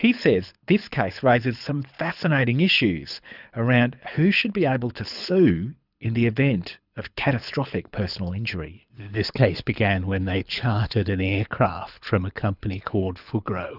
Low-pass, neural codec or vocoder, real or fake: 5.4 kHz; codec, 24 kHz, 6 kbps, HILCodec; fake